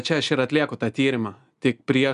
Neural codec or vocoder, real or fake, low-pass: none; real; 10.8 kHz